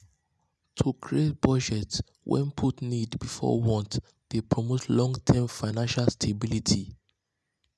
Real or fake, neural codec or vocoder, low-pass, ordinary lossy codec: real; none; none; none